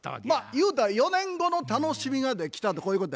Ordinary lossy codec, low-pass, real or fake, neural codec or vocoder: none; none; real; none